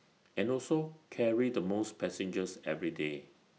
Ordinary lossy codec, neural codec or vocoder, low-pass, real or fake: none; none; none; real